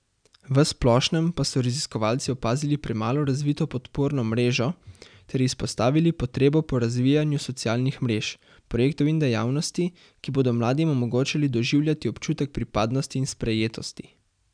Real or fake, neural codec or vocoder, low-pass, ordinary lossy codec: real; none; 9.9 kHz; none